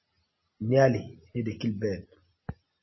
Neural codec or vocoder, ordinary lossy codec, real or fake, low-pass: none; MP3, 24 kbps; real; 7.2 kHz